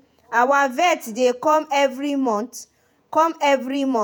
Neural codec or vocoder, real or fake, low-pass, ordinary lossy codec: vocoder, 48 kHz, 128 mel bands, Vocos; fake; none; none